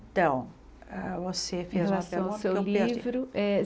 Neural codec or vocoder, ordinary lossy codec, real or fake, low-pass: none; none; real; none